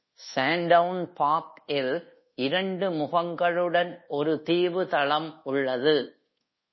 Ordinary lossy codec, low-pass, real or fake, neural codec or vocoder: MP3, 24 kbps; 7.2 kHz; fake; codec, 24 kHz, 1.2 kbps, DualCodec